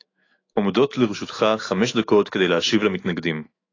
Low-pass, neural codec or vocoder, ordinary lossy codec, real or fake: 7.2 kHz; autoencoder, 48 kHz, 128 numbers a frame, DAC-VAE, trained on Japanese speech; AAC, 32 kbps; fake